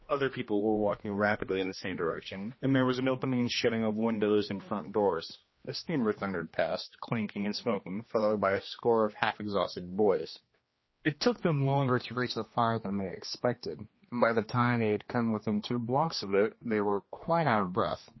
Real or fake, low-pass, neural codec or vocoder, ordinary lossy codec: fake; 7.2 kHz; codec, 16 kHz, 2 kbps, X-Codec, HuBERT features, trained on general audio; MP3, 24 kbps